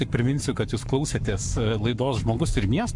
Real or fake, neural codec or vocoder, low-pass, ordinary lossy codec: fake; codec, 44.1 kHz, 7.8 kbps, Pupu-Codec; 10.8 kHz; MP3, 64 kbps